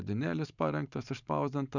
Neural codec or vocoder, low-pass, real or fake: vocoder, 44.1 kHz, 128 mel bands every 512 samples, BigVGAN v2; 7.2 kHz; fake